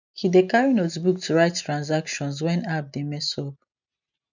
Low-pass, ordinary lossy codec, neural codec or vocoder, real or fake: 7.2 kHz; none; none; real